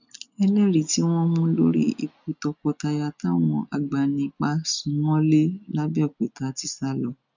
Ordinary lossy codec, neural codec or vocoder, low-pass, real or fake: none; none; 7.2 kHz; real